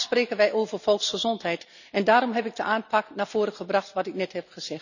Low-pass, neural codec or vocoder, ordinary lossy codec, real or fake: 7.2 kHz; none; none; real